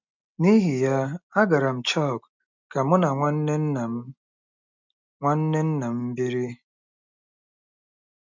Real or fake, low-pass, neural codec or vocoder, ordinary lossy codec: real; 7.2 kHz; none; none